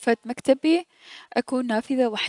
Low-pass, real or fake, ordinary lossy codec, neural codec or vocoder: 10.8 kHz; real; none; none